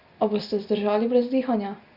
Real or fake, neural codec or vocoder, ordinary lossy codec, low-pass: real; none; Opus, 64 kbps; 5.4 kHz